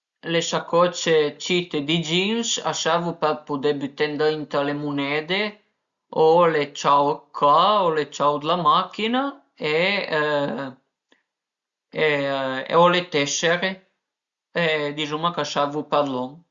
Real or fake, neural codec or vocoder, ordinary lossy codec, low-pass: real; none; Opus, 64 kbps; 7.2 kHz